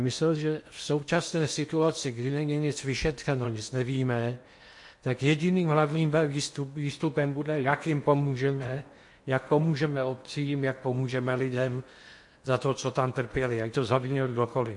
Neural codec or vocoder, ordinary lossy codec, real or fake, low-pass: codec, 16 kHz in and 24 kHz out, 0.8 kbps, FocalCodec, streaming, 65536 codes; MP3, 48 kbps; fake; 10.8 kHz